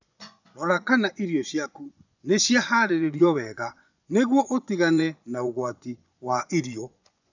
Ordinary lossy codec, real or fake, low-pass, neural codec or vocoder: none; fake; 7.2 kHz; vocoder, 24 kHz, 100 mel bands, Vocos